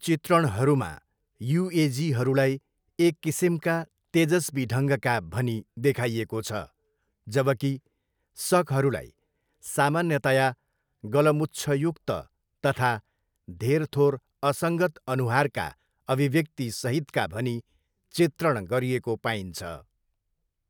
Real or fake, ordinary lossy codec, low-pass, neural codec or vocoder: real; none; none; none